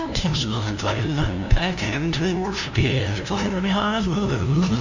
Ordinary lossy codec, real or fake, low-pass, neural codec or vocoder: none; fake; 7.2 kHz; codec, 16 kHz, 0.5 kbps, FunCodec, trained on LibriTTS, 25 frames a second